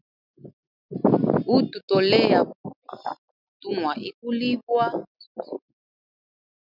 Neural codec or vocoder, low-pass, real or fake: none; 5.4 kHz; real